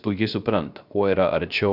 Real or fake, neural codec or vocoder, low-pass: fake; codec, 16 kHz, 0.3 kbps, FocalCodec; 5.4 kHz